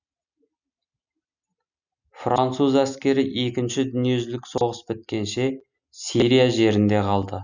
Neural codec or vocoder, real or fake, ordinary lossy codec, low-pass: none; real; none; 7.2 kHz